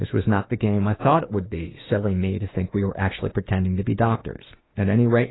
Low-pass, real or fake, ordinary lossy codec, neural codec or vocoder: 7.2 kHz; fake; AAC, 16 kbps; autoencoder, 48 kHz, 32 numbers a frame, DAC-VAE, trained on Japanese speech